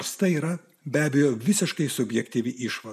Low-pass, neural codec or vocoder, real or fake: 14.4 kHz; none; real